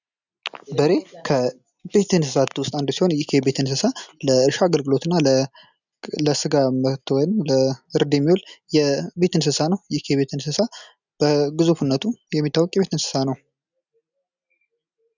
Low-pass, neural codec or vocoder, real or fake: 7.2 kHz; none; real